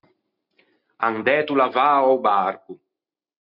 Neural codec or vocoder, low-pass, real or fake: none; 5.4 kHz; real